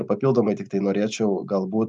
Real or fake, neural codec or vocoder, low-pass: real; none; 10.8 kHz